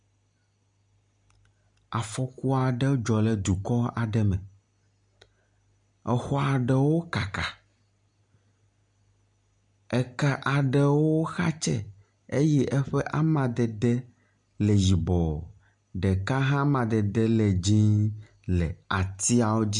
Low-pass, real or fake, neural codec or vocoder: 9.9 kHz; real; none